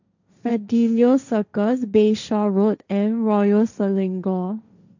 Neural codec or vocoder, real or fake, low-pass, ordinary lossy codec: codec, 16 kHz, 1.1 kbps, Voila-Tokenizer; fake; 7.2 kHz; none